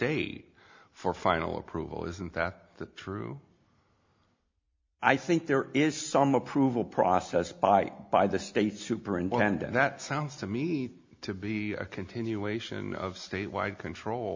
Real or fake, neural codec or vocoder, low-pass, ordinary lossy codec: real; none; 7.2 kHz; MP3, 64 kbps